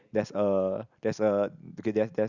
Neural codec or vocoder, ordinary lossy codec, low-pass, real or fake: none; Opus, 64 kbps; 7.2 kHz; real